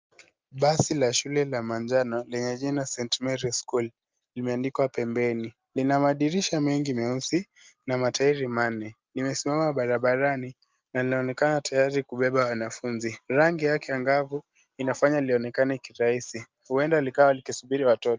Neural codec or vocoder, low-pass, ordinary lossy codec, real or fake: none; 7.2 kHz; Opus, 24 kbps; real